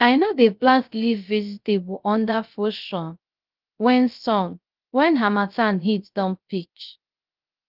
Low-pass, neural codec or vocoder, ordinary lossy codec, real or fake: 5.4 kHz; codec, 16 kHz, 0.3 kbps, FocalCodec; Opus, 24 kbps; fake